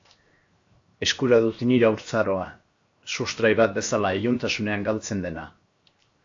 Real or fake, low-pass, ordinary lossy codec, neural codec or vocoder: fake; 7.2 kHz; AAC, 48 kbps; codec, 16 kHz, 0.7 kbps, FocalCodec